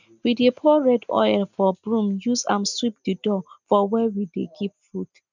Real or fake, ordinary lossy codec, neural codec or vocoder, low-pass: real; none; none; 7.2 kHz